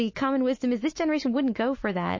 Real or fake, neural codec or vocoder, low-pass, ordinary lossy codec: fake; autoencoder, 48 kHz, 128 numbers a frame, DAC-VAE, trained on Japanese speech; 7.2 kHz; MP3, 32 kbps